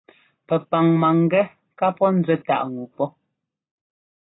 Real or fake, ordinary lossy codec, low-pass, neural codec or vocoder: real; AAC, 16 kbps; 7.2 kHz; none